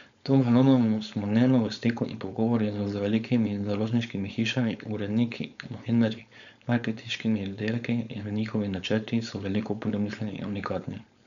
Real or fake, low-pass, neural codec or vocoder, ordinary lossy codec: fake; 7.2 kHz; codec, 16 kHz, 4.8 kbps, FACodec; none